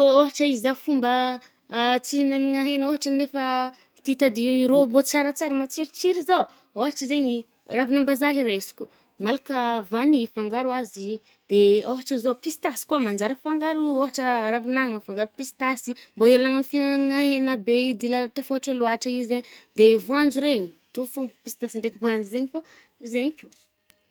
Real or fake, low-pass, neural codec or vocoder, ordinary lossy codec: fake; none; codec, 44.1 kHz, 2.6 kbps, SNAC; none